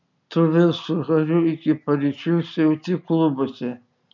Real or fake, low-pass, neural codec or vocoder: real; 7.2 kHz; none